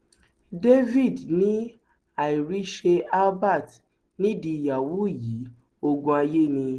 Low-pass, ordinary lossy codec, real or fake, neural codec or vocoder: 9.9 kHz; Opus, 16 kbps; real; none